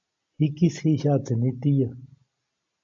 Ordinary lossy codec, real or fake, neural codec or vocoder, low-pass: MP3, 48 kbps; real; none; 7.2 kHz